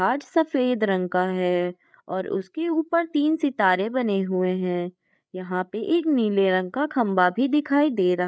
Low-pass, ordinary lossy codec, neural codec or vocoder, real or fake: none; none; codec, 16 kHz, 8 kbps, FreqCodec, larger model; fake